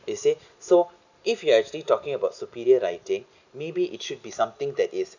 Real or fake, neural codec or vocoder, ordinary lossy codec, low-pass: real; none; none; 7.2 kHz